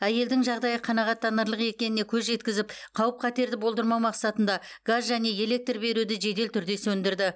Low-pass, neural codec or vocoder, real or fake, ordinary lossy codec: none; none; real; none